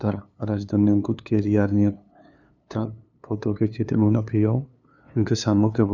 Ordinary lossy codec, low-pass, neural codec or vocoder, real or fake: none; 7.2 kHz; codec, 16 kHz, 2 kbps, FunCodec, trained on LibriTTS, 25 frames a second; fake